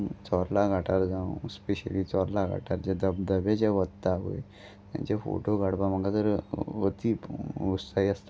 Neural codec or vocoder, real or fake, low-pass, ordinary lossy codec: none; real; none; none